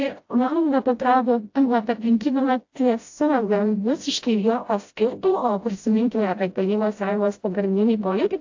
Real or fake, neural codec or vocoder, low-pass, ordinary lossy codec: fake; codec, 16 kHz, 0.5 kbps, FreqCodec, smaller model; 7.2 kHz; AAC, 48 kbps